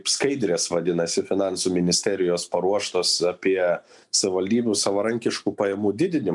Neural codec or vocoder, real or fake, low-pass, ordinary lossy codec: none; real; 10.8 kHz; MP3, 96 kbps